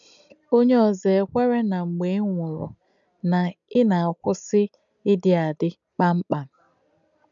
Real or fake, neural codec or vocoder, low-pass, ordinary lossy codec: real; none; 7.2 kHz; none